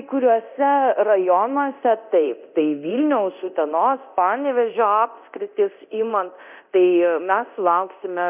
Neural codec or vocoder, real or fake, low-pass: codec, 24 kHz, 0.9 kbps, DualCodec; fake; 3.6 kHz